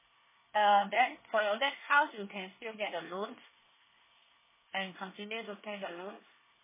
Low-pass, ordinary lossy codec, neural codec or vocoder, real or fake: 3.6 kHz; MP3, 16 kbps; codec, 24 kHz, 1 kbps, SNAC; fake